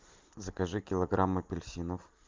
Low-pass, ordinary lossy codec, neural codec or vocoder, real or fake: 7.2 kHz; Opus, 24 kbps; none; real